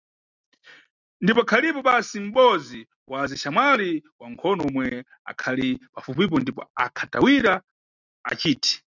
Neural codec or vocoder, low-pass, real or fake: none; 7.2 kHz; real